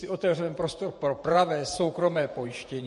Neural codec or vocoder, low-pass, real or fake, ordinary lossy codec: vocoder, 48 kHz, 128 mel bands, Vocos; 14.4 kHz; fake; MP3, 48 kbps